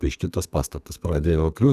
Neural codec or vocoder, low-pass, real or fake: codec, 32 kHz, 1.9 kbps, SNAC; 14.4 kHz; fake